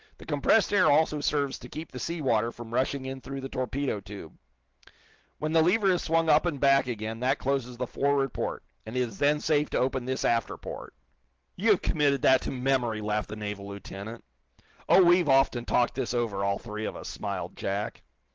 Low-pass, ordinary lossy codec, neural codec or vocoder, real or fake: 7.2 kHz; Opus, 16 kbps; none; real